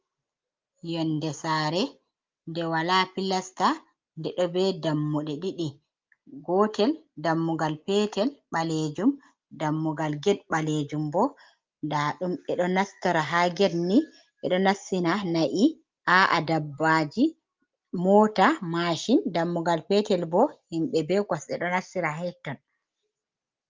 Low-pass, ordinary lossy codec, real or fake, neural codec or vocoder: 7.2 kHz; Opus, 24 kbps; real; none